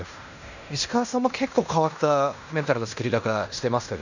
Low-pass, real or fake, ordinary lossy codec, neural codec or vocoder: 7.2 kHz; fake; none; codec, 16 kHz in and 24 kHz out, 0.9 kbps, LongCat-Audio-Codec, fine tuned four codebook decoder